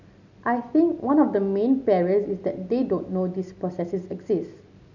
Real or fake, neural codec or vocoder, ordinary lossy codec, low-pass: real; none; none; 7.2 kHz